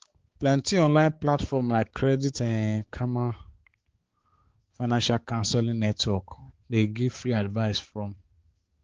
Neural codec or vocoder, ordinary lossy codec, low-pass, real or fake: codec, 16 kHz, 4 kbps, X-Codec, HuBERT features, trained on balanced general audio; Opus, 16 kbps; 7.2 kHz; fake